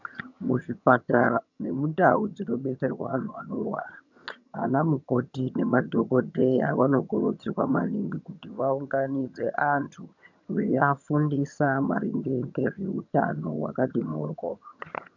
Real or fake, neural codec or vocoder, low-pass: fake; vocoder, 22.05 kHz, 80 mel bands, HiFi-GAN; 7.2 kHz